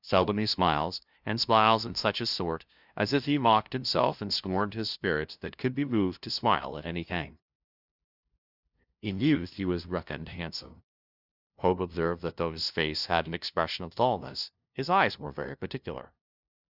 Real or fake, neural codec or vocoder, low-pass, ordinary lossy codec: fake; codec, 16 kHz, 0.5 kbps, FunCodec, trained on LibriTTS, 25 frames a second; 5.4 kHz; Opus, 64 kbps